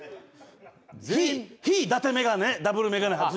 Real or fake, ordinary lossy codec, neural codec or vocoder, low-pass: real; none; none; none